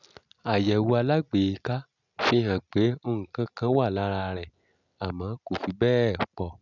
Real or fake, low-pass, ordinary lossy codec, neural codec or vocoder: real; 7.2 kHz; none; none